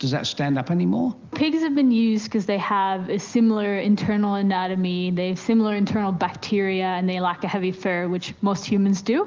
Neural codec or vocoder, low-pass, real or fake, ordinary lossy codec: none; 7.2 kHz; real; Opus, 32 kbps